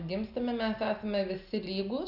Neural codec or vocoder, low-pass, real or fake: none; 5.4 kHz; real